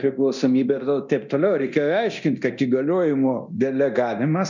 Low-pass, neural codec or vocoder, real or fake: 7.2 kHz; codec, 24 kHz, 0.9 kbps, DualCodec; fake